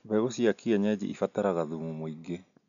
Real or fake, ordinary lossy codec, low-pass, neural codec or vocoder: real; none; 7.2 kHz; none